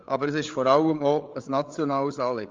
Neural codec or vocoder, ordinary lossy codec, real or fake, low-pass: codec, 16 kHz, 8 kbps, FreqCodec, larger model; Opus, 32 kbps; fake; 7.2 kHz